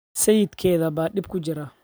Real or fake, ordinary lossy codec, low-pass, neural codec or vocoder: fake; none; none; vocoder, 44.1 kHz, 128 mel bands every 256 samples, BigVGAN v2